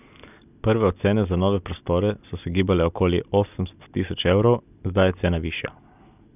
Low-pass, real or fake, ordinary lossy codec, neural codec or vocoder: 3.6 kHz; real; none; none